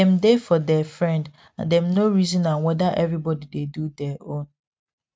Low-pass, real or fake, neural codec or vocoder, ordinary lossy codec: none; real; none; none